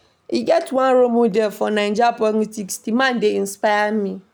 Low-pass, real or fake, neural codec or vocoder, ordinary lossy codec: none; real; none; none